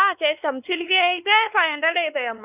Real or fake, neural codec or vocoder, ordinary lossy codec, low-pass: fake; codec, 16 kHz, 2 kbps, X-Codec, WavLM features, trained on Multilingual LibriSpeech; none; 3.6 kHz